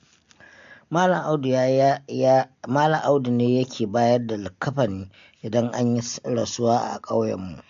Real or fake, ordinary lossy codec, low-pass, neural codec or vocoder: real; AAC, 64 kbps; 7.2 kHz; none